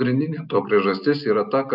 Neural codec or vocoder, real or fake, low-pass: none; real; 5.4 kHz